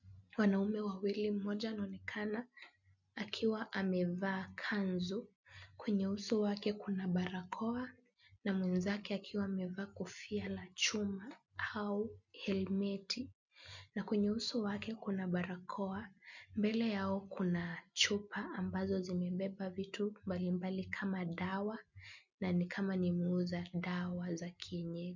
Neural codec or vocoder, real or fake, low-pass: none; real; 7.2 kHz